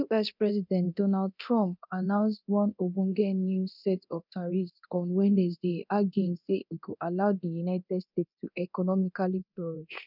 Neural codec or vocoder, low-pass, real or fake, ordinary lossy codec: codec, 24 kHz, 0.9 kbps, DualCodec; 5.4 kHz; fake; none